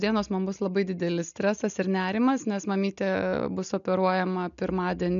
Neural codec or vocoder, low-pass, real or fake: none; 7.2 kHz; real